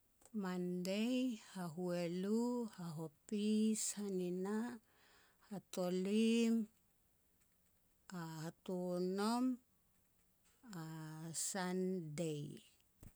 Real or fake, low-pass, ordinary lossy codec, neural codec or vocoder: real; none; none; none